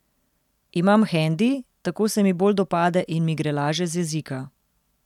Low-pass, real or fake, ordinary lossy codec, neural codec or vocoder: 19.8 kHz; real; none; none